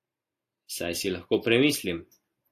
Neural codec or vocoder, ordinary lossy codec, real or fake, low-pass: none; AAC, 64 kbps; real; 14.4 kHz